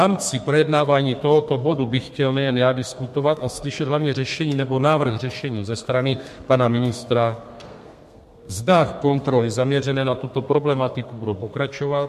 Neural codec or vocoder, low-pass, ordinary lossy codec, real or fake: codec, 32 kHz, 1.9 kbps, SNAC; 14.4 kHz; MP3, 64 kbps; fake